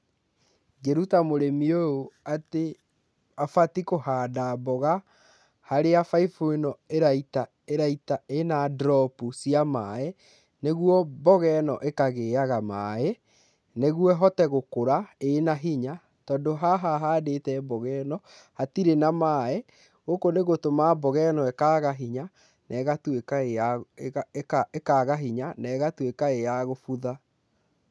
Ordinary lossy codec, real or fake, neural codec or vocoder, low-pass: none; real; none; none